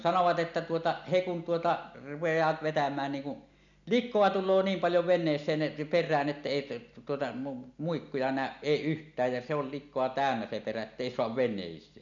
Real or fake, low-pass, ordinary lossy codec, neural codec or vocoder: real; 7.2 kHz; none; none